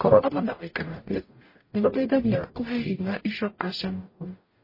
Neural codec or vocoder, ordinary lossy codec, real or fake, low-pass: codec, 44.1 kHz, 0.9 kbps, DAC; MP3, 24 kbps; fake; 5.4 kHz